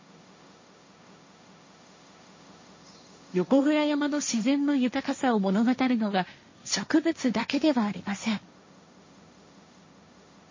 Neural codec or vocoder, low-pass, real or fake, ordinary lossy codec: codec, 16 kHz, 1.1 kbps, Voila-Tokenizer; 7.2 kHz; fake; MP3, 32 kbps